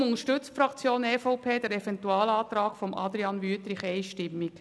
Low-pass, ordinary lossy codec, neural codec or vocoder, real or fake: none; none; none; real